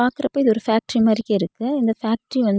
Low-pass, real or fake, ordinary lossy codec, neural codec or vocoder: none; real; none; none